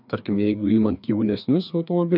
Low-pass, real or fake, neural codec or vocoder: 5.4 kHz; fake; codec, 16 kHz, 2 kbps, FreqCodec, larger model